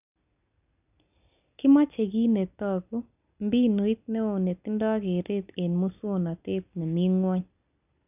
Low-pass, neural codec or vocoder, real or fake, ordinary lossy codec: 3.6 kHz; none; real; none